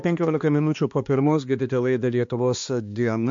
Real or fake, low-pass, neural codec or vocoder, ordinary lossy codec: fake; 7.2 kHz; codec, 16 kHz, 2 kbps, X-Codec, HuBERT features, trained on balanced general audio; MP3, 48 kbps